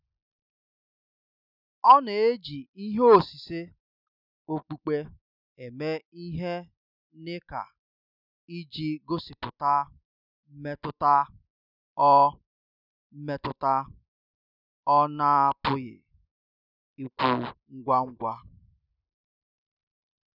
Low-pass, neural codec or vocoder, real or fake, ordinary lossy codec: 5.4 kHz; none; real; none